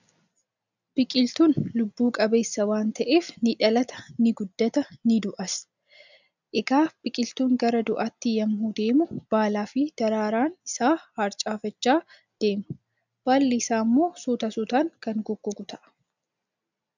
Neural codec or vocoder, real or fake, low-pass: none; real; 7.2 kHz